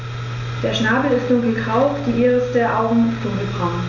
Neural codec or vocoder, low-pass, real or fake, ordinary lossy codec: none; 7.2 kHz; real; none